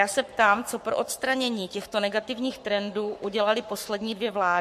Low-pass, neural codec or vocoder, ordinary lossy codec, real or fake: 14.4 kHz; codec, 44.1 kHz, 7.8 kbps, Pupu-Codec; MP3, 64 kbps; fake